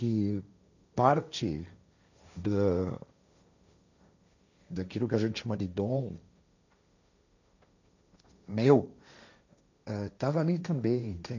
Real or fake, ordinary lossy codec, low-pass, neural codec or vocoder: fake; none; 7.2 kHz; codec, 16 kHz, 1.1 kbps, Voila-Tokenizer